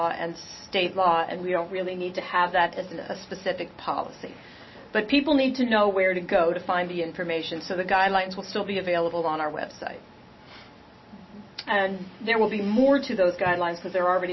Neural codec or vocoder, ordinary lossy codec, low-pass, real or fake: none; MP3, 24 kbps; 7.2 kHz; real